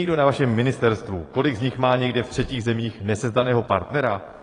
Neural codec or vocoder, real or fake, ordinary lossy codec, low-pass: vocoder, 22.05 kHz, 80 mel bands, Vocos; fake; AAC, 32 kbps; 9.9 kHz